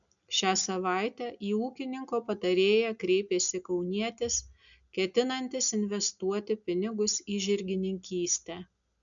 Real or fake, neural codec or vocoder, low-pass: real; none; 7.2 kHz